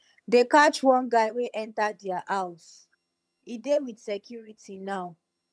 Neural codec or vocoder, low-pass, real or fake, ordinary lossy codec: vocoder, 22.05 kHz, 80 mel bands, HiFi-GAN; none; fake; none